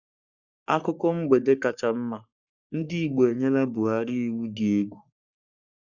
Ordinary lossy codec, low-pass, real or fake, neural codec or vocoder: Opus, 64 kbps; 7.2 kHz; fake; codec, 44.1 kHz, 3.4 kbps, Pupu-Codec